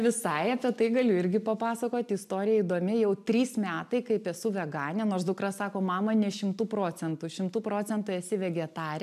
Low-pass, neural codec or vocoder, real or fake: 14.4 kHz; none; real